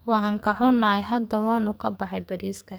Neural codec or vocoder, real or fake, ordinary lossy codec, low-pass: codec, 44.1 kHz, 2.6 kbps, SNAC; fake; none; none